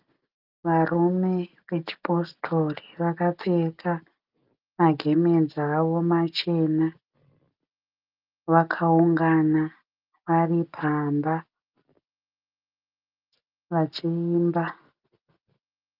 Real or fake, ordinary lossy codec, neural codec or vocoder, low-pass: real; Opus, 32 kbps; none; 5.4 kHz